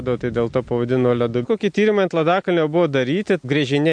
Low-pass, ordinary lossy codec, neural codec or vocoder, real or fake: 9.9 kHz; MP3, 64 kbps; none; real